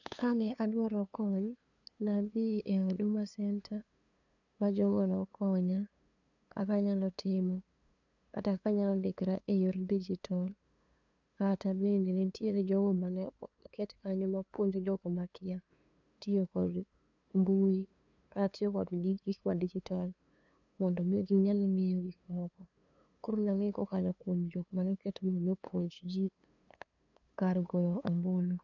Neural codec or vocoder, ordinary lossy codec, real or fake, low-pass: codec, 16 kHz, 2 kbps, FunCodec, trained on LibriTTS, 25 frames a second; none; fake; 7.2 kHz